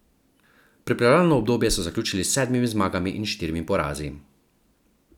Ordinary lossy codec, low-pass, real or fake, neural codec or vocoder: none; 19.8 kHz; real; none